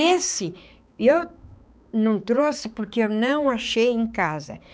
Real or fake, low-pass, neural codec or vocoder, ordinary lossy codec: fake; none; codec, 16 kHz, 4 kbps, X-Codec, HuBERT features, trained on balanced general audio; none